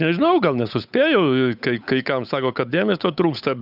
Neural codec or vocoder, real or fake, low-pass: codec, 16 kHz, 8 kbps, FunCodec, trained on Chinese and English, 25 frames a second; fake; 5.4 kHz